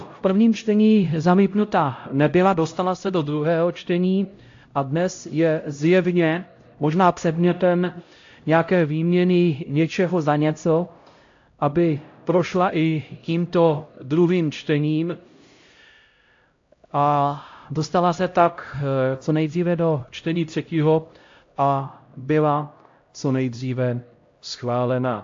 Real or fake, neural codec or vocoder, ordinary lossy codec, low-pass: fake; codec, 16 kHz, 0.5 kbps, X-Codec, HuBERT features, trained on LibriSpeech; AAC, 48 kbps; 7.2 kHz